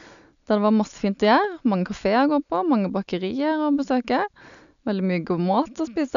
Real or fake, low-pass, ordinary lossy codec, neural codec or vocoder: real; 7.2 kHz; none; none